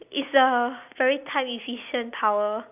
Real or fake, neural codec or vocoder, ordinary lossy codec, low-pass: real; none; none; 3.6 kHz